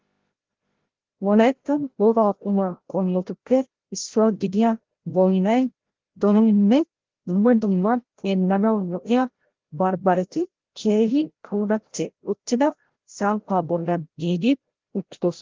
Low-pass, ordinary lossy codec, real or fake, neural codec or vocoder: 7.2 kHz; Opus, 16 kbps; fake; codec, 16 kHz, 0.5 kbps, FreqCodec, larger model